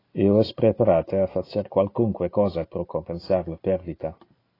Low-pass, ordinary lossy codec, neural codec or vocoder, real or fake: 5.4 kHz; AAC, 24 kbps; none; real